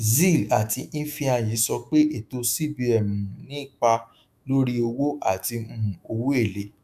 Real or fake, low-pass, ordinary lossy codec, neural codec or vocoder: fake; 14.4 kHz; none; autoencoder, 48 kHz, 128 numbers a frame, DAC-VAE, trained on Japanese speech